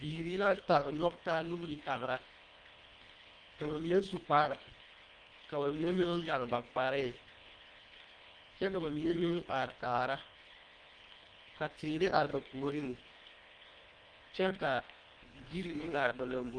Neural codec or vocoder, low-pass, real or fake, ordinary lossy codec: codec, 24 kHz, 1.5 kbps, HILCodec; 9.9 kHz; fake; Opus, 32 kbps